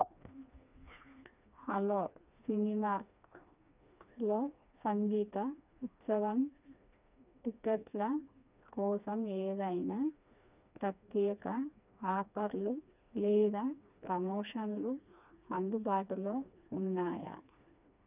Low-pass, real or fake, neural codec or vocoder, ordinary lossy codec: 3.6 kHz; fake; codec, 16 kHz, 2 kbps, FreqCodec, smaller model; none